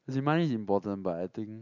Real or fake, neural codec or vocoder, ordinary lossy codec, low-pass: real; none; none; 7.2 kHz